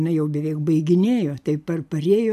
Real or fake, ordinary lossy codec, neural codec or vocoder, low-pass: real; AAC, 96 kbps; none; 14.4 kHz